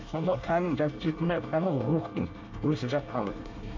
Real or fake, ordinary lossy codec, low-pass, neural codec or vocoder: fake; MP3, 48 kbps; 7.2 kHz; codec, 24 kHz, 1 kbps, SNAC